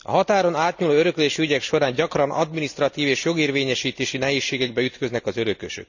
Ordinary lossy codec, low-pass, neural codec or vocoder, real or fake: none; 7.2 kHz; none; real